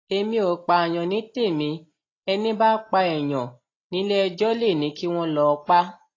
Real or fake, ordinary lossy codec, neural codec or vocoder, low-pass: real; AAC, 32 kbps; none; 7.2 kHz